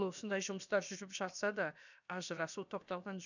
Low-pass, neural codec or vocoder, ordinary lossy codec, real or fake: 7.2 kHz; codec, 16 kHz, about 1 kbps, DyCAST, with the encoder's durations; none; fake